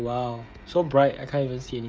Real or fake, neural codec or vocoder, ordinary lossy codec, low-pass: fake; codec, 16 kHz, 16 kbps, FreqCodec, smaller model; none; none